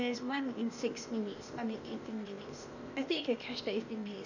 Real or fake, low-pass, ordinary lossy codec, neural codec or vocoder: fake; 7.2 kHz; none; codec, 16 kHz, 0.8 kbps, ZipCodec